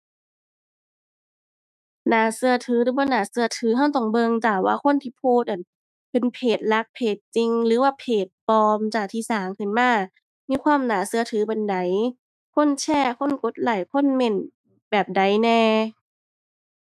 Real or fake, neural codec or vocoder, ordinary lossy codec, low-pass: fake; autoencoder, 48 kHz, 128 numbers a frame, DAC-VAE, trained on Japanese speech; none; 14.4 kHz